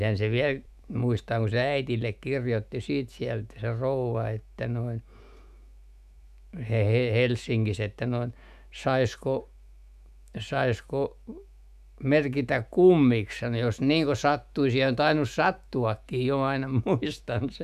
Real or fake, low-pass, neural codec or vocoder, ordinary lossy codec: fake; 14.4 kHz; autoencoder, 48 kHz, 128 numbers a frame, DAC-VAE, trained on Japanese speech; none